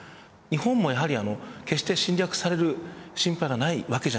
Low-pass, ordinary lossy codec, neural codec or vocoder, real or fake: none; none; none; real